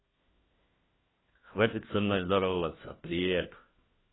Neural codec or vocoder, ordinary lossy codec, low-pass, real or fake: codec, 16 kHz, 1 kbps, FunCodec, trained on LibriTTS, 50 frames a second; AAC, 16 kbps; 7.2 kHz; fake